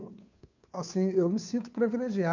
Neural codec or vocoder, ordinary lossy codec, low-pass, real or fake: codec, 16 kHz, 2 kbps, FunCodec, trained on Chinese and English, 25 frames a second; none; 7.2 kHz; fake